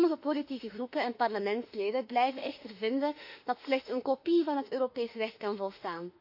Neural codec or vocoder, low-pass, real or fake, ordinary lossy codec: autoencoder, 48 kHz, 32 numbers a frame, DAC-VAE, trained on Japanese speech; 5.4 kHz; fake; AAC, 48 kbps